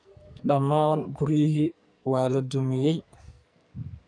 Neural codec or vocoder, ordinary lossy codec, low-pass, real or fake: codec, 44.1 kHz, 2.6 kbps, SNAC; none; 9.9 kHz; fake